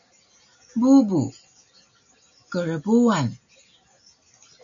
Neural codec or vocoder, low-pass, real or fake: none; 7.2 kHz; real